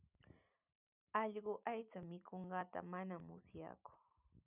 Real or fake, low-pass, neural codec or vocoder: fake; 3.6 kHz; vocoder, 44.1 kHz, 128 mel bands every 512 samples, BigVGAN v2